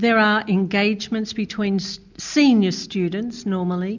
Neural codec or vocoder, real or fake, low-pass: none; real; 7.2 kHz